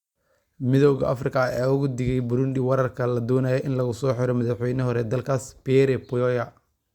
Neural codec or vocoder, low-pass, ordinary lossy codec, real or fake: none; 19.8 kHz; Opus, 64 kbps; real